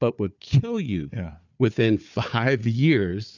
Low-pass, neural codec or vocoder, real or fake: 7.2 kHz; codec, 16 kHz, 4 kbps, FunCodec, trained on Chinese and English, 50 frames a second; fake